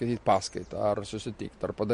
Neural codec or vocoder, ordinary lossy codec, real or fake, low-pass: none; MP3, 48 kbps; real; 14.4 kHz